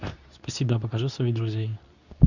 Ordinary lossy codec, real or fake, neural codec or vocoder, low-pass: none; fake; codec, 24 kHz, 0.9 kbps, WavTokenizer, medium speech release version 2; 7.2 kHz